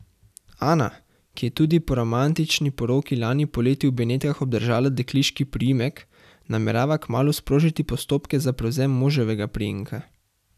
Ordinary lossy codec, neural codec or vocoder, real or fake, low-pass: none; none; real; 14.4 kHz